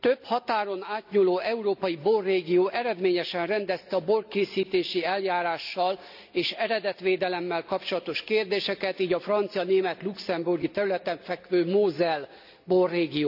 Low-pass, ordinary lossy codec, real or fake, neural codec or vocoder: 5.4 kHz; none; real; none